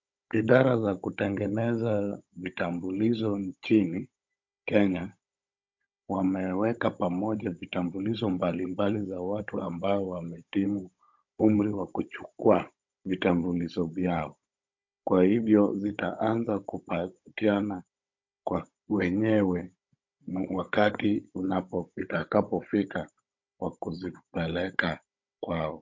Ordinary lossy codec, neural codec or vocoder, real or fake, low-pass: MP3, 48 kbps; codec, 16 kHz, 16 kbps, FunCodec, trained on Chinese and English, 50 frames a second; fake; 7.2 kHz